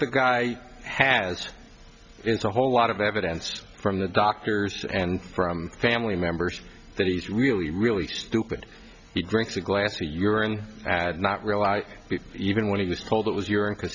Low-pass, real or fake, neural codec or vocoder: 7.2 kHz; real; none